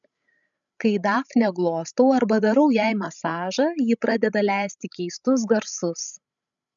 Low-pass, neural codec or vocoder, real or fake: 7.2 kHz; codec, 16 kHz, 16 kbps, FreqCodec, larger model; fake